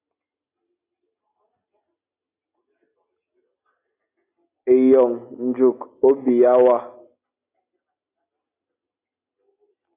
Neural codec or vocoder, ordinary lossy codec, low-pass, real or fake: none; AAC, 24 kbps; 3.6 kHz; real